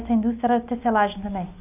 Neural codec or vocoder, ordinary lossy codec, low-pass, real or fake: none; none; 3.6 kHz; real